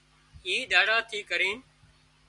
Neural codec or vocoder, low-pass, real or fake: none; 10.8 kHz; real